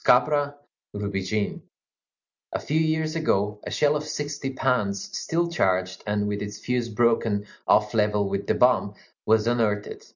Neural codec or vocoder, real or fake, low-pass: none; real; 7.2 kHz